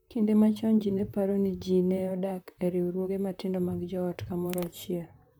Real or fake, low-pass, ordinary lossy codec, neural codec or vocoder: fake; none; none; vocoder, 44.1 kHz, 128 mel bands, Pupu-Vocoder